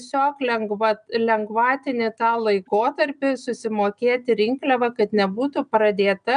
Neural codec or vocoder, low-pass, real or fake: none; 9.9 kHz; real